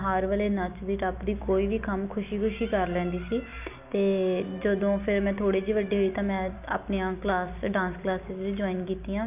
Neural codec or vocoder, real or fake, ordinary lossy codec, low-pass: none; real; none; 3.6 kHz